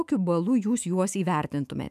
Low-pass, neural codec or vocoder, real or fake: 14.4 kHz; autoencoder, 48 kHz, 128 numbers a frame, DAC-VAE, trained on Japanese speech; fake